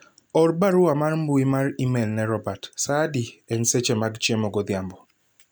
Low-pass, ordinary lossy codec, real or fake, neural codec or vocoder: none; none; real; none